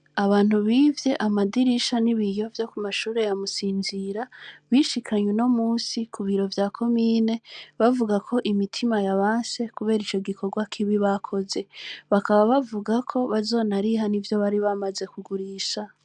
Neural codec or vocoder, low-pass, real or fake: none; 9.9 kHz; real